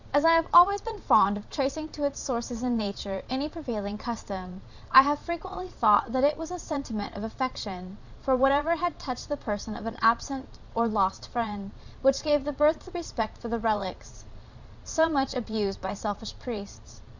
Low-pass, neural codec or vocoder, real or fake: 7.2 kHz; vocoder, 22.05 kHz, 80 mel bands, Vocos; fake